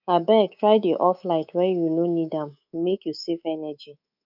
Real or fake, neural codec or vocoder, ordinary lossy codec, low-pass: fake; codec, 24 kHz, 3.1 kbps, DualCodec; none; 5.4 kHz